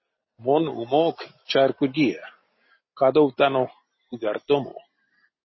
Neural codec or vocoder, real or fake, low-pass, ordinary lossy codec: none; real; 7.2 kHz; MP3, 24 kbps